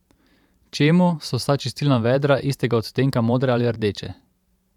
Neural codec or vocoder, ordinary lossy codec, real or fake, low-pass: vocoder, 44.1 kHz, 128 mel bands every 512 samples, BigVGAN v2; none; fake; 19.8 kHz